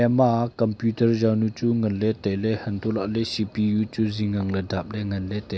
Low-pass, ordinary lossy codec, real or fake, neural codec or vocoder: none; none; real; none